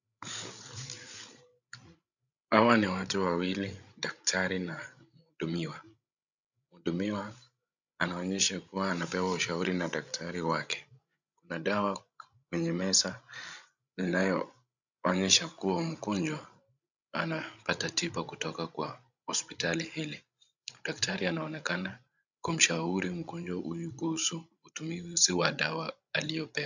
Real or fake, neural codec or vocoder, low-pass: fake; codec, 16 kHz, 16 kbps, FreqCodec, larger model; 7.2 kHz